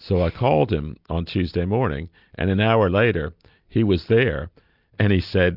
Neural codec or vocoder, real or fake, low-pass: none; real; 5.4 kHz